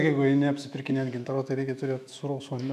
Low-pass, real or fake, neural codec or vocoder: 14.4 kHz; fake; autoencoder, 48 kHz, 128 numbers a frame, DAC-VAE, trained on Japanese speech